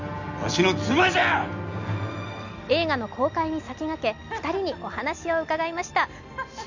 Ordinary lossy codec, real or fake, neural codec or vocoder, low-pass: none; real; none; 7.2 kHz